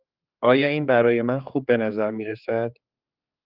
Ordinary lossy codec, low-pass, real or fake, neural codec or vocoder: Opus, 24 kbps; 5.4 kHz; fake; codec, 16 kHz, 4 kbps, X-Codec, HuBERT features, trained on general audio